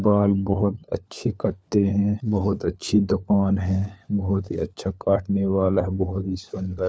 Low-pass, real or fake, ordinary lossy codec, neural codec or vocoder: none; fake; none; codec, 16 kHz, 4 kbps, FunCodec, trained on LibriTTS, 50 frames a second